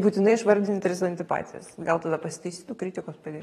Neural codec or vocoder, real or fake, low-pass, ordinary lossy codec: vocoder, 44.1 kHz, 128 mel bands every 512 samples, BigVGAN v2; fake; 19.8 kHz; AAC, 32 kbps